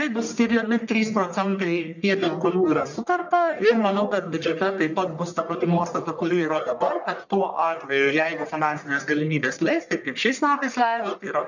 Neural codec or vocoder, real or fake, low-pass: codec, 44.1 kHz, 1.7 kbps, Pupu-Codec; fake; 7.2 kHz